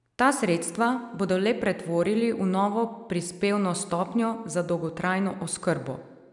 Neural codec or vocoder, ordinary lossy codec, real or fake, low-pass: none; none; real; 10.8 kHz